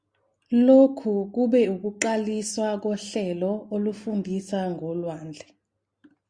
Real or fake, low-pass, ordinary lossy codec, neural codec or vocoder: real; 9.9 kHz; Opus, 64 kbps; none